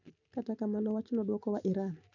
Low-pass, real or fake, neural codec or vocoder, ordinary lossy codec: 7.2 kHz; real; none; none